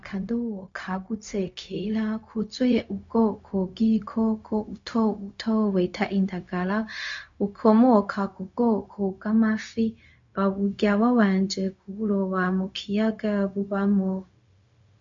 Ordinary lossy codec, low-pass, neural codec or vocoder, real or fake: MP3, 48 kbps; 7.2 kHz; codec, 16 kHz, 0.4 kbps, LongCat-Audio-Codec; fake